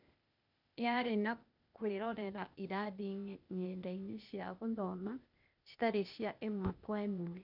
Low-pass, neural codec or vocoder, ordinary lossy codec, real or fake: 5.4 kHz; codec, 16 kHz, 0.8 kbps, ZipCodec; none; fake